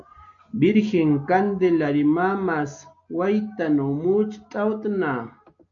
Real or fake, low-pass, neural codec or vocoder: real; 7.2 kHz; none